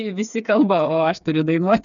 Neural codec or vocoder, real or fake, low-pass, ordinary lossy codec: codec, 16 kHz, 4 kbps, FreqCodec, smaller model; fake; 7.2 kHz; MP3, 96 kbps